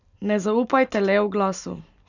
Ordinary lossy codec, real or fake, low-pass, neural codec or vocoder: none; real; 7.2 kHz; none